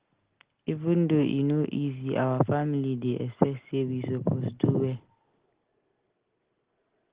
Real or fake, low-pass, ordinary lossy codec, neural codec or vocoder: real; 3.6 kHz; Opus, 16 kbps; none